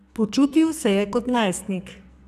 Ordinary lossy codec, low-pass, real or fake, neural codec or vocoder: none; 14.4 kHz; fake; codec, 44.1 kHz, 2.6 kbps, SNAC